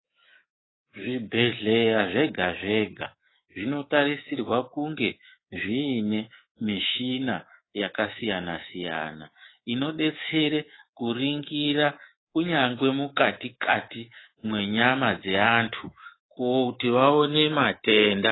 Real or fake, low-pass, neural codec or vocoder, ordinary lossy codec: fake; 7.2 kHz; codec, 24 kHz, 3.1 kbps, DualCodec; AAC, 16 kbps